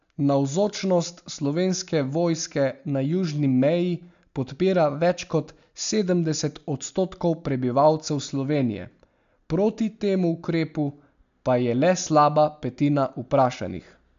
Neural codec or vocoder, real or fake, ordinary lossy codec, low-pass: none; real; MP3, 64 kbps; 7.2 kHz